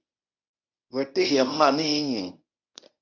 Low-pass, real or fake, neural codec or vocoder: 7.2 kHz; fake; codec, 24 kHz, 0.9 kbps, WavTokenizer, medium speech release version 1